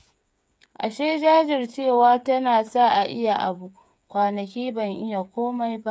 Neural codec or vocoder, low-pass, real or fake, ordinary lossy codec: codec, 16 kHz, 8 kbps, FreqCodec, smaller model; none; fake; none